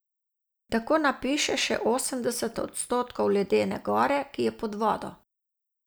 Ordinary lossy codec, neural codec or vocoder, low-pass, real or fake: none; none; none; real